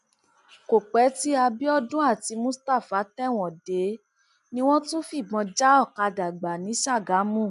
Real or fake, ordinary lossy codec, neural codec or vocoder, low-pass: real; none; none; 10.8 kHz